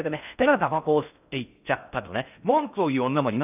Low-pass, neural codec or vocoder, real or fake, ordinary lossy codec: 3.6 kHz; codec, 16 kHz in and 24 kHz out, 0.6 kbps, FocalCodec, streaming, 4096 codes; fake; none